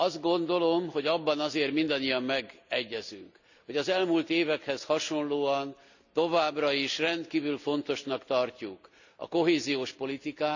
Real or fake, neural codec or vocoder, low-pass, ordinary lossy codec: real; none; 7.2 kHz; none